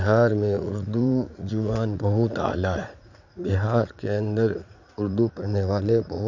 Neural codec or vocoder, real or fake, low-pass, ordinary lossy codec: vocoder, 44.1 kHz, 80 mel bands, Vocos; fake; 7.2 kHz; none